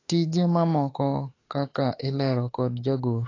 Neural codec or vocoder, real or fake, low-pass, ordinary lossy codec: autoencoder, 48 kHz, 32 numbers a frame, DAC-VAE, trained on Japanese speech; fake; 7.2 kHz; AAC, 32 kbps